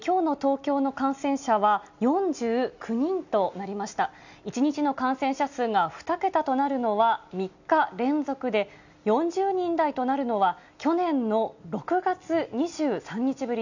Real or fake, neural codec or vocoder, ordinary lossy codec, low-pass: real; none; none; 7.2 kHz